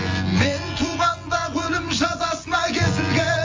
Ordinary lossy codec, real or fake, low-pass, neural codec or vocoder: Opus, 32 kbps; fake; 7.2 kHz; vocoder, 24 kHz, 100 mel bands, Vocos